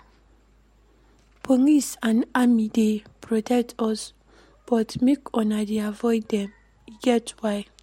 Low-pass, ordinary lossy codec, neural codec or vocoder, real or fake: 19.8 kHz; MP3, 64 kbps; none; real